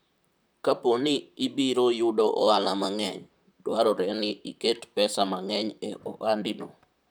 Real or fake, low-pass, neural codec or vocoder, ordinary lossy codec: fake; none; vocoder, 44.1 kHz, 128 mel bands, Pupu-Vocoder; none